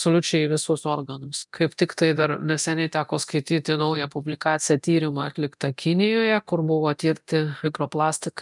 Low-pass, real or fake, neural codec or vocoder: 10.8 kHz; fake; codec, 24 kHz, 0.9 kbps, DualCodec